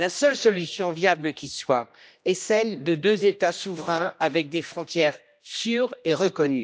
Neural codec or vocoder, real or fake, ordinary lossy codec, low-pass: codec, 16 kHz, 1 kbps, X-Codec, HuBERT features, trained on general audio; fake; none; none